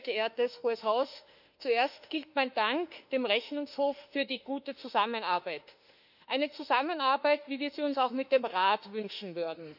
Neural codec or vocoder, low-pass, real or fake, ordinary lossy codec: autoencoder, 48 kHz, 32 numbers a frame, DAC-VAE, trained on Japanese speech; 5.4 kHz; fake; none